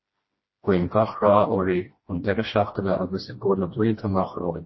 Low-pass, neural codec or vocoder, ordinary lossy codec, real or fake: 7.2 kHz; codec, 16 kHz, 1 kbps, FreqCodec, smaller model; MP3, 24 kbps; fake